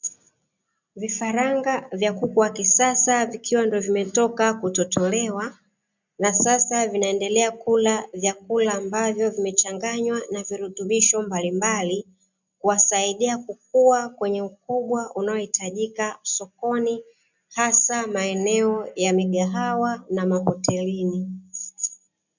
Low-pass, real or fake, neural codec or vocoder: 7.2 kHz; real; none